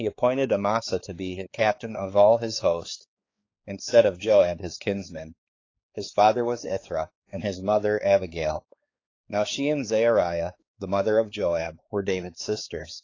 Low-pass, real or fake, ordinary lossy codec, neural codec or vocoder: 7.2 kHz; fake; AAC, 32 kbps; codec, 16 kHz, 4 kbps, X-Codec, HuBERT features, trained on balanced general audio